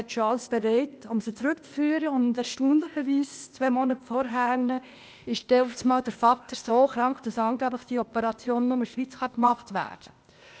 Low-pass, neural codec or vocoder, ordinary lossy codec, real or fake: none; codec, 16 kHz, 0.8 kbps, ZipCodec; none; fake